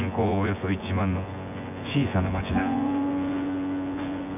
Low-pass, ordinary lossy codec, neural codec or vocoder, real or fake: 3.6 kHz; none; vocoder, 24 kHz, 100 mel bands, Vocos; fake